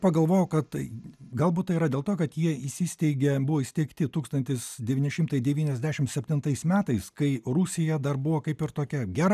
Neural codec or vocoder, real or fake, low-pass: none; real; 14.4 kHz